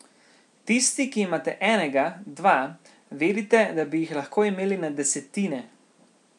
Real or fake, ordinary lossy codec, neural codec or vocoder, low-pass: real; none; none; 10.8 kHz